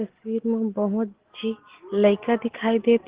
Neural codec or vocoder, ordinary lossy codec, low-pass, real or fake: none; Opus, 16 kbps; 3.6 kHz; real